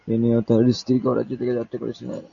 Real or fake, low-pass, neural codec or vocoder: real; 7.2 kHz; none